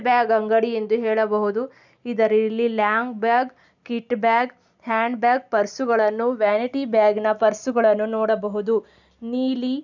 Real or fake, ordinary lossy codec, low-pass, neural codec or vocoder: real; none; 7.2 kHz; none